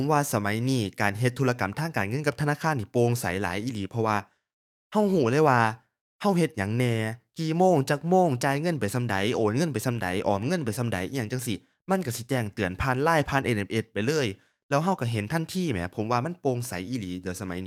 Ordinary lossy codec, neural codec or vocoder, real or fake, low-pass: none; codec, 44.1 kHz, 7.8 kbps, DAC; fake; 19.8 kHz